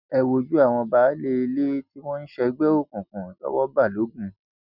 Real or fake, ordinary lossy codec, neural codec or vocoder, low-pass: real; none; none; 5.4 kHz